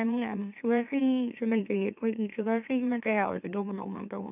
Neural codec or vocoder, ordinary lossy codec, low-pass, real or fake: autoencoder, 44.1 kHz, a latent of 192 numbers a frame, MeloTTS; none; 3.6 kHz; fake